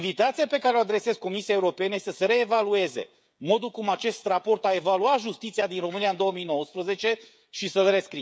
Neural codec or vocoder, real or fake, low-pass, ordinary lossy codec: codec, 16 kHz, 16 kbps, FreqCodec, smaller model; fake; none; none